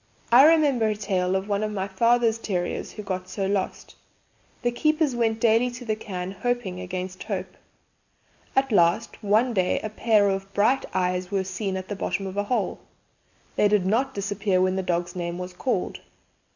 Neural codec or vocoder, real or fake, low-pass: none; real; 7.2 kHz